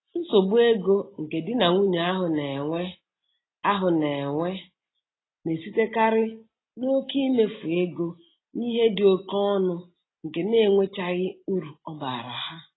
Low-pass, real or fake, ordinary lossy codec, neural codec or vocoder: 7.2 kHz; real; AAC, 16 kbps; none